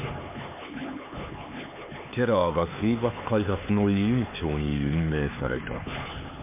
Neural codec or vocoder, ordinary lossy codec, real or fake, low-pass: codec, 16 kHz, 4 kbps, X-Codec, HuBERT features, trained on LibriSpeech; MP3, 32 kbps; fake; 3.6 kHz